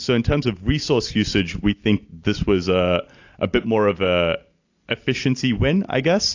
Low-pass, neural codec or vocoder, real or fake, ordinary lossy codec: 7.2 kHz; none; real; AAC, 48 kbps